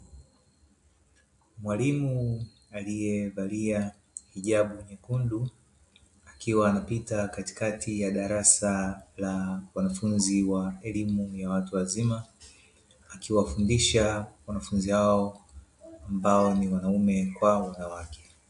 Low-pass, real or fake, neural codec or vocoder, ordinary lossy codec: 10.8 kHz; real; none; AAC, 64 kbps